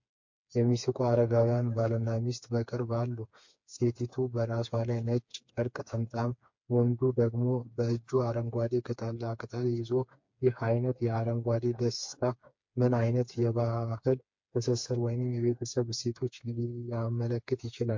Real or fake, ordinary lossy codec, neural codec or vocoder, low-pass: fake; MP3, 48 kbps; codec, 16 kHz, 4 kbps, FreqCodec, smaller model; 7.2 kHz